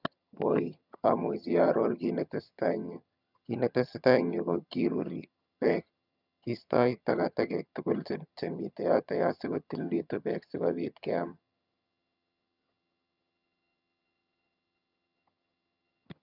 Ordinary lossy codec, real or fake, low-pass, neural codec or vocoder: none; fake; 5.4 kHz; vocoder, 22.05 kHz, 80 mel bands, HiFi-GAN